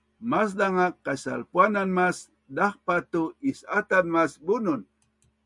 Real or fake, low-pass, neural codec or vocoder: real; 10.8 kHz; none